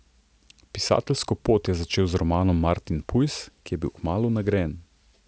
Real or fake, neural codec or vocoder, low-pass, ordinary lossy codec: real; none; none; none